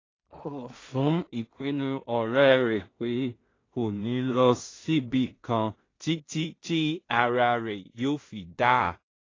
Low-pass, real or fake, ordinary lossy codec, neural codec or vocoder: 7.2 kHz; fake; AAC, 32 kbps; codec, 16 kHz in and 24 kHz out, 0.4 kbps, LongCat-Audio-Codec, two codebook decoder